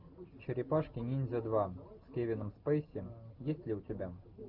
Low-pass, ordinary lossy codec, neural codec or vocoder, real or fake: 5.4 kHz; Opus, 32 kbps; none; real